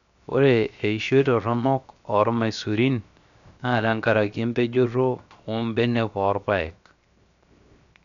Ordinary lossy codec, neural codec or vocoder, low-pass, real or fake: none; codec, 16 kHz, 0.7 kbps, FocalCodec; 7.2 kHz; fake